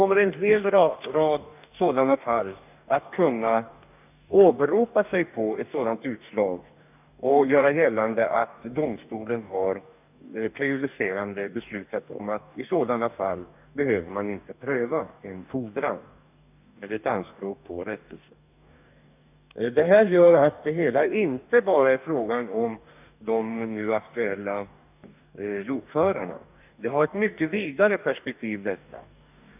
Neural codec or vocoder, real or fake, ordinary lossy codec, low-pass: codec, 44.1 kHz, 2.6 kbps, DAC; fake; none; 3.6 kHz